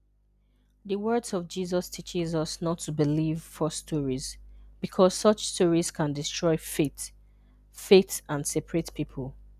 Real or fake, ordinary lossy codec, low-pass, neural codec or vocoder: real; none; 14.4 kHz; none